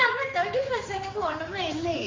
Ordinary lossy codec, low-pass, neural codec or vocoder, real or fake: Opus, 32 kbps; 7.2 kHz; codec, 16 kHz, 4 kbps, X-Codec, HuBERT features, trained on general audio; fake